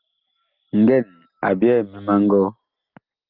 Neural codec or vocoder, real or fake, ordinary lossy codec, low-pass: none; real; Opus, 32 kbps; 5.4 kHz